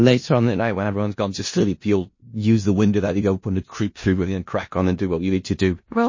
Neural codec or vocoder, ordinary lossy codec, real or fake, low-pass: codec, 16 kHz in and 24 kHz out, 0.4 kbps, LongCat-Audio-Codec, four codebook decoder; MP3, 32 kbps; fake; 7.2 kHz